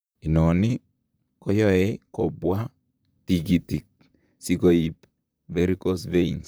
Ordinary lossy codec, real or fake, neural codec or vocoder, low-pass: none; fake; vocoder, 44.1 kHz, 128 mel bands, Pupu-Vocoder; none